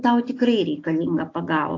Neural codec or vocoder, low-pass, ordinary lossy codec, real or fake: none; 7.2 kHz; AAC, 48 kbps; real